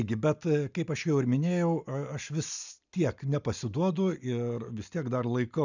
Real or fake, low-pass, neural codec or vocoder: real; 7.2 kHz; none